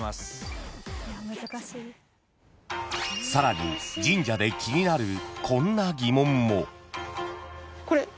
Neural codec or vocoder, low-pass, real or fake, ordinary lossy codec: none; none; real; none